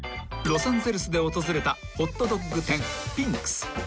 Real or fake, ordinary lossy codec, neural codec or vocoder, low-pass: real; none; none; none